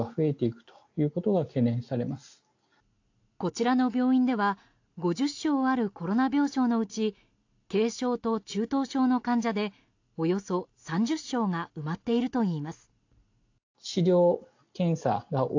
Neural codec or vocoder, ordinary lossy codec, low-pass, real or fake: none; AAC, 48 kbps; 7.2 kHz; real